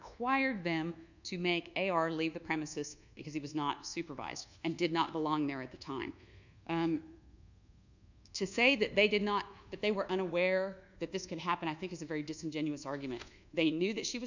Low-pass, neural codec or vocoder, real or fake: 7.2 kHz; codec, 24 kHz, 1.2 kbps, DualCodec; fake